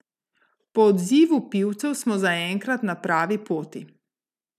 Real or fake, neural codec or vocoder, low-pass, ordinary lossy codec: real; none; 14.4 kHz; none